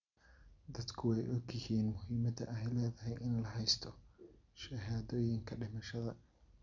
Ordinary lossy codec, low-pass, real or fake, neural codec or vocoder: none; 7.2 kHz; real; none